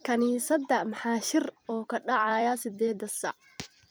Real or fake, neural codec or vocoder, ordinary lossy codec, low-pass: fake; vocoder, 44.1 kHz, 128 mel bands every 256 samples, BigVGAN v2; none; none